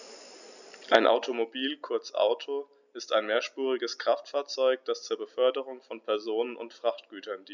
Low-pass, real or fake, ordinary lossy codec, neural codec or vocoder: 7.2 kHz; real; none; none